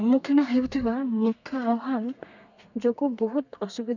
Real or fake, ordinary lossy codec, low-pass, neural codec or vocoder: fake; none; 7.2 kHz; codec, 32 kHz, 1.9 kbps, SNAC